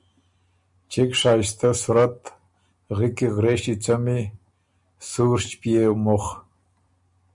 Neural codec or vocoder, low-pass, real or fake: none; 10.8 kHz; real